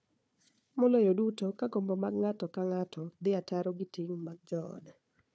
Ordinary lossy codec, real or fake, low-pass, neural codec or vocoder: none; fake; none; codec, 16 kHz, 4 kbps, FunCodec, trained on Chinese and English, 50 frames a second